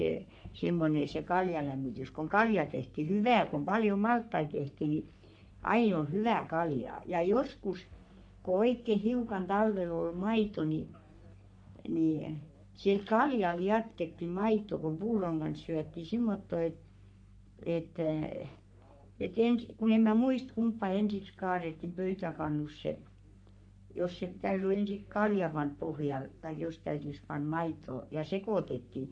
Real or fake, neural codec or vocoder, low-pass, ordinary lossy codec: fake; codec, 44.1 kHz, 3.4 kbps, Pupu-Codec; 9.9 kHz; none